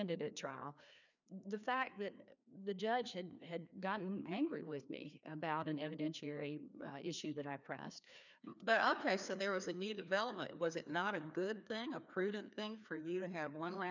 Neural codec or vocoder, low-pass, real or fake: codec, 16 kHz, 2 kbps, FreqCodec, larger model; 7.2 kHz; fake